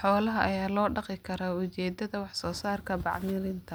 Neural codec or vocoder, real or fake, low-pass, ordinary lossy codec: none; real; none; none